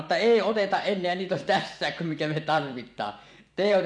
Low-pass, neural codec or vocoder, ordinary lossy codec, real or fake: 9.9 kHz; vocoder, 24 kHz, 100 mel bands, Vocos; none; fake